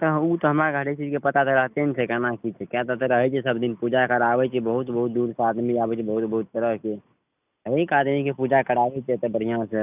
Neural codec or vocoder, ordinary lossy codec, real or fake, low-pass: none; none; real; 3.6 kHz